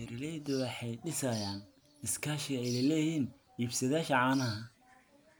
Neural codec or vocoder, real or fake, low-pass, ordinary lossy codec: none; real; none; none